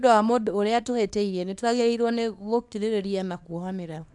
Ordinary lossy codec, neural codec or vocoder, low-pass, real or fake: none; codec, 24 kHz, 0.9 kbps, WavTokenizer, small release; 10.8 kHz; fake